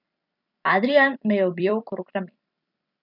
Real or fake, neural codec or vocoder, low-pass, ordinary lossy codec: fake; vocoder, 44.1 kHz, 128 mel bands every 256 samples, BigVGAN v2; 5.4 kHz; none